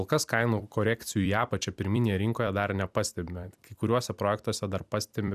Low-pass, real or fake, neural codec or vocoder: 14.4 kHz; fake; vocoder, 44.1 kHz, 128 mel bands every 256 samples, BigVGAN v2